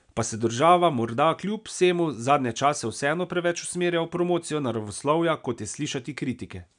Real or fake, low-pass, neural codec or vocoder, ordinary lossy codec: real; 9.9 kHz; none; none